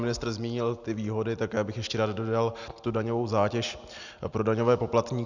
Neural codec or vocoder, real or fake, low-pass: none; real; 7.2 kHz